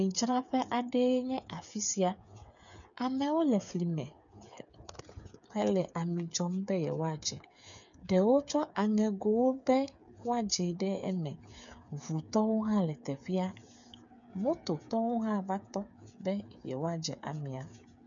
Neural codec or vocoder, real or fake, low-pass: codec, 16 kHz, 8 kbps, FreqCodec, smaller model; fake; 7.2 kHz